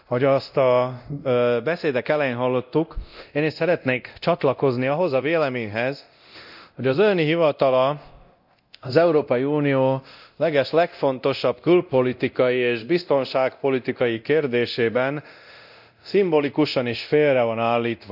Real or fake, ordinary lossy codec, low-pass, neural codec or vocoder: fake; none; 5.4 kHz; codec, 24 kHz, 0.9 kbps, DualCodec